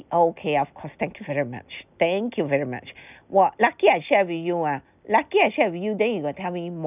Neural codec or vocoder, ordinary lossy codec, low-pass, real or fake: none; none; 3.6 kHz; real